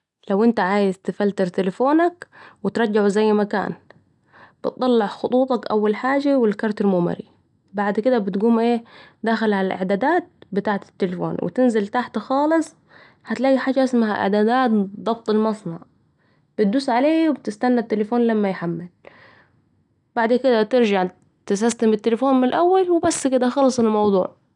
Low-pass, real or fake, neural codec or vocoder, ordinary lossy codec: 10.8 kHz; real; none; none